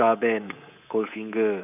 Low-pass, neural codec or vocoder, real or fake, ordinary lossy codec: 3.6 kHz; codec, 24 kHz, 3.1 kbps, DualCodec; fake; none